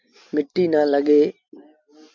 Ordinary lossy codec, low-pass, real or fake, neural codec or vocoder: MP3, 64 kbps; 7.2 kHz; real; none